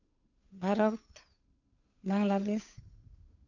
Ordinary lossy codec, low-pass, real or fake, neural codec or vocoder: none; 7.2 kHz; fake; codec, 16 kHz, 8 kbps, FunCodec, trained on Chinese and English, 25 frames a second